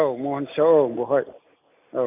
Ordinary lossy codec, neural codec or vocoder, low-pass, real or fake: MP3, 32 kbps; none; 3.6 kHz; real